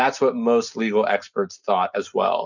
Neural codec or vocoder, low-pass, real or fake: none; 7.2 kHz; real